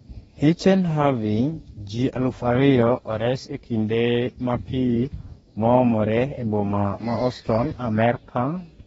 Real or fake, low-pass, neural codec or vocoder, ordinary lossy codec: fake; 19.8 kHz; codec, 44.1 kHz, 2.6 kbps, DAC; AAC, 24 kbps